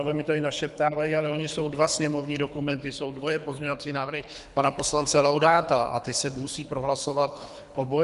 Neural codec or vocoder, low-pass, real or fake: codec, 24 kHz, 3 kbps, HILCodec; 10.8 kHz; fake